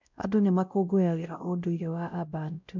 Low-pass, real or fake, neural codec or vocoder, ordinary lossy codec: 7.2 kHz; fake; codec, 16 kHz, 0.5 kbps, X-Codec, WavLM features, trained on Multilingual LibriSpeech; none